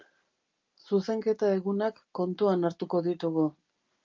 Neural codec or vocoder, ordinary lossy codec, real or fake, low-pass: none; Opus, 24 kbps; real; 7.2 kHz